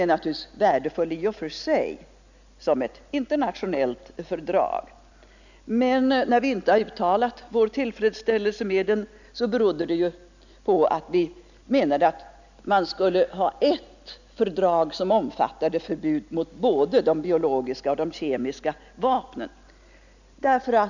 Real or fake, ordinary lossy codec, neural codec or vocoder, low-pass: real; none; none; 7.2 kHz